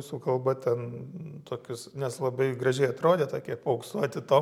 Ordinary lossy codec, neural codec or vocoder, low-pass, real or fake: MP3, 96 kbps; none; 19.8 kHz; real